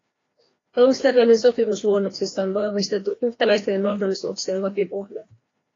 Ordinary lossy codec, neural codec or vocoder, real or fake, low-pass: AAC, 32 kbps; codec, 16 kHz, 1 kbps, FreqCodec, larger model; fake; 7.2 kHz